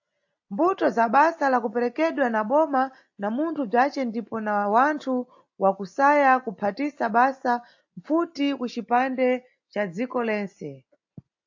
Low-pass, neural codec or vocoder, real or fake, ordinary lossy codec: 7.2 kHz; none; real; AAC, 48 kbps